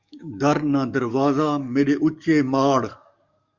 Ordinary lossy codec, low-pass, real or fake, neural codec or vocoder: Opus, 64 kbps; 7.2 kHz; fake; codec, 44.1 kHz, 7.8 kbps, DAC